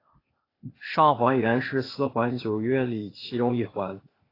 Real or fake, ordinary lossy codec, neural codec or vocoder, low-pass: fake; AAC, 24 kbps; codec, 16 kHz, 0.8 kbps, ZipCodec; 5.4 kHz